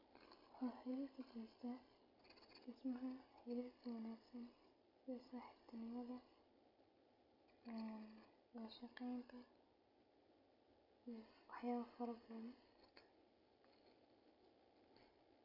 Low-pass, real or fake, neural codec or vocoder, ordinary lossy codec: 5.4 kHz; real; none; none